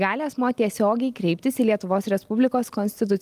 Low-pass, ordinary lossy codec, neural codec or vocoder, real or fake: 14.4 kHz; Opus, 32 kbps; none; real